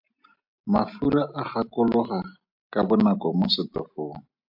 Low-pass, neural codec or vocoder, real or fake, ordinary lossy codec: 5.4 kHz; none; real; MP3, 48 kbps